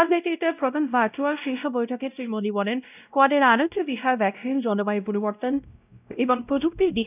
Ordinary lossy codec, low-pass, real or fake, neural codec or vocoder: none; 3.6 kHz; fake; codec, 16 kHz, 0.5 kbps, X-Codec, WavLM features, trained on Multilingual LibriSpeech